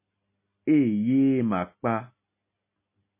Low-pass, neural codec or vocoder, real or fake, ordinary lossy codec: 3.6 kHz; none; real; MP3, 24 kbps